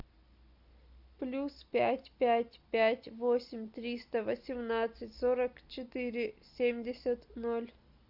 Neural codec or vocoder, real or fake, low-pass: none; real; 5.4 kHz